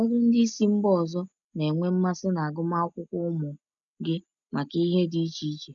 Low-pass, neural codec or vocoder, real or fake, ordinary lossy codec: 7.2 kHz; none; real; none